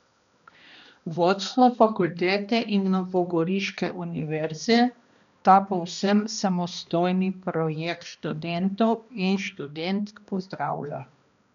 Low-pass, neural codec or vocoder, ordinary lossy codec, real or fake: 7.2 kHz; codec, 16 kHz, 1 kbps, X-Codec, HuBERT features, trained on balanced general audio; MP3, 96 kbps; fake